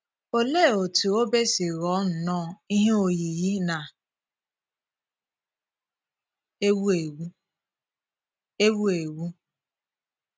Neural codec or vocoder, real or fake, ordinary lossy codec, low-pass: none; real; none; none